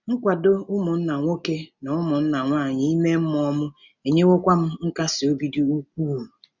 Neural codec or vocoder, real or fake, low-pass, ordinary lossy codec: none; real; 7.2 kHz; none